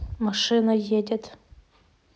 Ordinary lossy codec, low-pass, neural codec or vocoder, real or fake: none; none; none; real